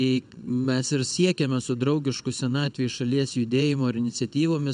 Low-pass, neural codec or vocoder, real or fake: 9.9 kHz; vocoder, 22.05 kHz, 80 mel bands, WaveNeXt; fake